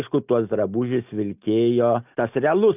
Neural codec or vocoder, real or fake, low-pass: none; real; 3.6 kHz